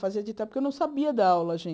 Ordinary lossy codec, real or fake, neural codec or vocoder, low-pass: none; real; none; none